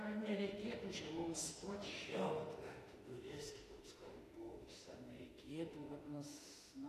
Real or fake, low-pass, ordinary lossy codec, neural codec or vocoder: fake; 14.4 kHz; AAC, 48 kbps; autoencoder, 48 kHz, 32 numbers a frame, DAC-VAE, trained on Japanese speech